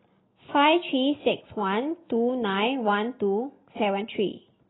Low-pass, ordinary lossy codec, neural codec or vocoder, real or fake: 7.2 kHz; AAC, 16 kbps; none; real